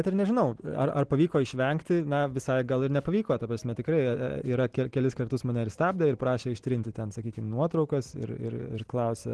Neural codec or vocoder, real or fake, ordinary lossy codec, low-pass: none; real; Opus, 16 kbps; 10.8 kHz